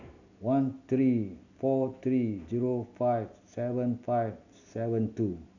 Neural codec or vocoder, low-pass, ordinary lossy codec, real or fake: none; 7.2 kHz; none; real